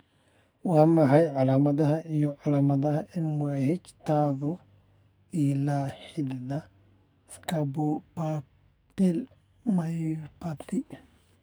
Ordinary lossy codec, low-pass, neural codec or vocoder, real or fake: none; none; codec, 44.1 kHz, 2.6 kbps, SNAC; fake